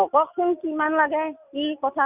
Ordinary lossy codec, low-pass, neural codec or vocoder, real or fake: none; 3.6 kHz; none; real